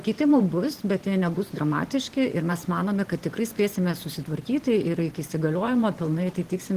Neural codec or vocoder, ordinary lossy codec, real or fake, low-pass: vocoder, 44.1 kHz, 128 mel bands every 512 samples, BigVGAN v2; Opus, 16 kbps; fake; 14.4 kHz